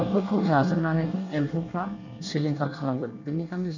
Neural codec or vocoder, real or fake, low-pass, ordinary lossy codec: codec, 24 kHz, 1 kbps, SNAC; fake; 7.2 kHz; none